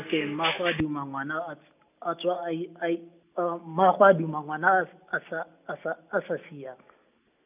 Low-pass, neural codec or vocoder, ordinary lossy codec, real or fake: 3.6 kHz; none; none; real